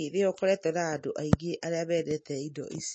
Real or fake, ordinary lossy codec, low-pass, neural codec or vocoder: real; MP3, 32 kbps; 9.9 kHz; none